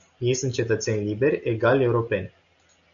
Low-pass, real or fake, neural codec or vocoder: 7.2 kHz; real; none